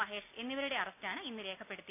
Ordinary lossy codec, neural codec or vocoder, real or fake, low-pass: none; none; real; 3.6 kHz